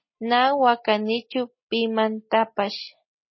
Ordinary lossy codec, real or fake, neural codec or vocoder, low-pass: MP3, 24 kbps; real; none; 7.2 kHz